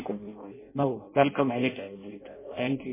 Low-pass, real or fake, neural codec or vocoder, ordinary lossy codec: 3.6 kHz; fake; codec, 16 kHz in and 24 kHz out, 0.6 kbps, FireRedTTS-2 codec; MP3, 16 kbps